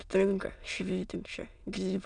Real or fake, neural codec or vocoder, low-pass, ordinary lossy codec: fake; autoencoder, 22.05 kHz, a latent of 192 numbers a frame, VITS, trained on many speakers; 9.9 kHz; MP3, 96 kbps